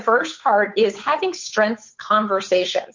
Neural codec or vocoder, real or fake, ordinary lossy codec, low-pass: codec, 16 kHz, 8 kbps, FunCodec, trained on Chinese and English, 25 frames a second; fake; MP3, 48 kbps; 7.2 kHz